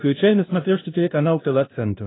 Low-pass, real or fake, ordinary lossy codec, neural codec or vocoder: 7.2 kHz; fake; AAC, 16 kbps; codec, 16 kHz, 0.5 kbps, FunCodec, trained on LibriTTS, 25 frames a second